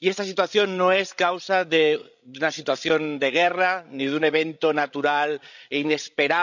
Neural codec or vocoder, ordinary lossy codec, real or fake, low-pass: codec, 16 kHz, 16 kbps, FreqCodec, larger model; none; fake; 7.2 kHz